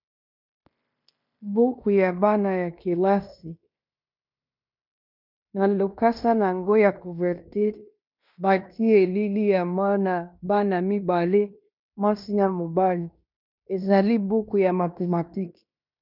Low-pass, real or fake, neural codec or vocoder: 5.4 kHz; fake; codec, 16 kHz in and 24 kHz out, 0.9 kbps, LongCat-Audio-Codec, fine tuned four codebook decoder